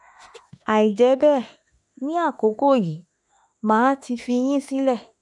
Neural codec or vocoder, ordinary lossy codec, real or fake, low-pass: autoencoder, 48 kHz, 32 numbers a frame, DAC-VAE, trained on Japanese speech; none; fake; 10.8 kHz